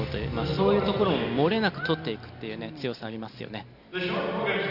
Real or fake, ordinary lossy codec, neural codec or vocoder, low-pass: real; none; none; 5.4 kHz